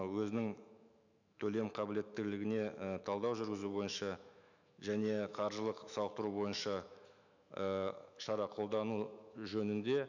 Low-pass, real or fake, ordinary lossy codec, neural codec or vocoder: 7.2 kHz; fake; none; autoencoder, 48 kHz, 128 numbers a frame, DAC-VAE, trained on Japanese speech